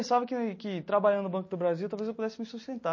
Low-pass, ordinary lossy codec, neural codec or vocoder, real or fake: 7.2 kHz; MP3, 32 kbps; none; real